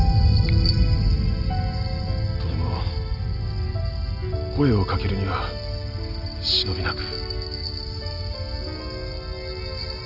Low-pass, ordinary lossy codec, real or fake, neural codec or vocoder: 5.4 kHz; none; real; none